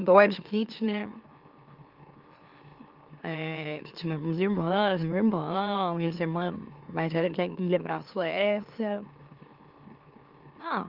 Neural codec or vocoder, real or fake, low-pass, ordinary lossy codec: autoencoder, 44.1 kHz, a latent of 192 numbers a frame, MeloTTS; fake; 5.4 kHz; Opus, 32 kbps